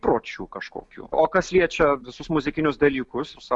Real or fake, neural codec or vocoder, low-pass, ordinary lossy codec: real; none; 10.8 kHz; MP3, 96 kbps